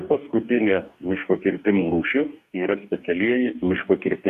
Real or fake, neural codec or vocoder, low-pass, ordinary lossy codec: fake; codec, 44.1 kHz, 2.6 kbps, DAC; 14.4 kHz; MP3, 96 kbps